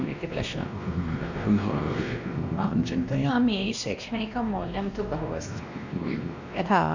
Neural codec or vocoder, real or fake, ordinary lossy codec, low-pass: codec, 16 kHz, 1 kbps, X-Codec, WavLM features, trained on Multilingual LibriSpeech; fake; none; 7.2 kHz